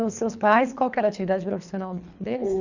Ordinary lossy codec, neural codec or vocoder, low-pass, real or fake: none; codec, 24 kHz, 3 kbps, HILCodec; 7.2 kHz; fake